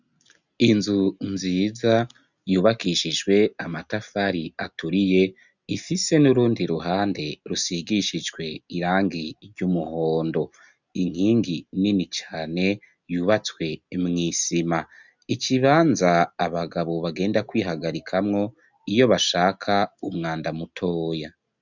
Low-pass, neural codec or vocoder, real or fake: 7.2 kHz; none; real